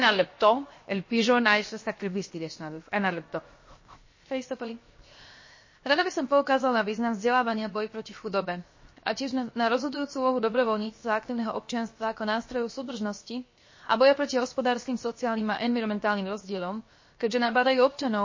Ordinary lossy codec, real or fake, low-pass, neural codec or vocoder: MP3, 32 kbps; fake; 7.2 kHz; codec, 16 kHz, 0.7 kbps, FocalCodec